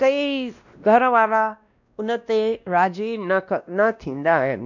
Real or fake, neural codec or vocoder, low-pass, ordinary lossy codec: fake; codec, 16 kHz, 1 kbps, X-Codec, WavLM features, trained on Multilingual LibriSpeech; 7.2 kHz; none